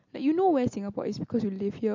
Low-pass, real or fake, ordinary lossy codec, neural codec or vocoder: 7.2 kHz; real; MP3, 48 kbps; none